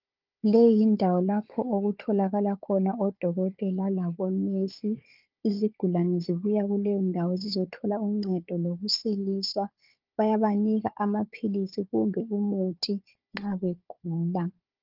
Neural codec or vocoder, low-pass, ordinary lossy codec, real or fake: codec, 16 kHz, 4 kbps, FunCodec, trained on Chinese and English, 50 frames a second; 5.4 kHz; Opus, 24 kbps; fake